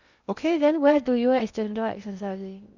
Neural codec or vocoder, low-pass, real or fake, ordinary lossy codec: codec, 16 kHz in and 24 kHz out, 0.6 kbps, FocalCodec, streaming, 2048 codes; 7.2 kHz; fake; none